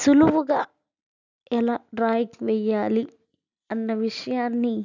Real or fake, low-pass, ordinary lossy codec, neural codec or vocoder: real; 7.2 kHz; none; none